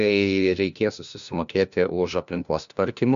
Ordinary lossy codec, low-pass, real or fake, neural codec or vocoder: MP3, 96 kbps; 7.2 kHz; fake; codec, 16 kHz, 1 kbps, FunCodec, trained on LibriTTS, 50 frames a second